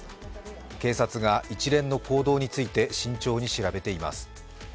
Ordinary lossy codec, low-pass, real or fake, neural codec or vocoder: none; none; real; none